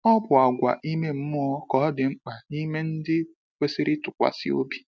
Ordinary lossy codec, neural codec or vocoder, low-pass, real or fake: none; none; none; real